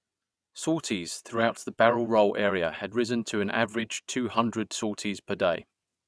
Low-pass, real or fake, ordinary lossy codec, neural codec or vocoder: none; fake; none; vocoder, 22.05 kHz, 80 mel bands, WaveNeXt